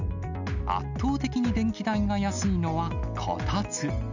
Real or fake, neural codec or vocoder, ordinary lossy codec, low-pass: real; none; none; 7.2 kHz